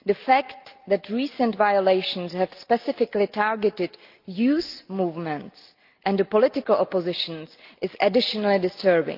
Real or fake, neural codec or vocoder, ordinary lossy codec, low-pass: real; none; Opus, 24 kbps; 5.4 kHz